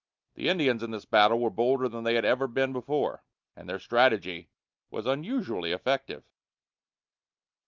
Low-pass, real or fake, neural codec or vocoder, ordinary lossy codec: 7.2 kHz; real; none; Opus, 24 kbps